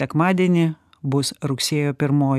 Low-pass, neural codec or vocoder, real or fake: 14.4 kHz; none; real